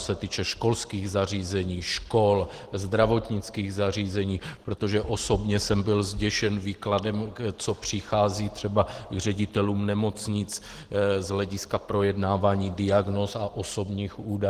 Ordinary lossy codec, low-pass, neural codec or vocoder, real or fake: Opus, 16 kbps; 14.4 kHz; none; real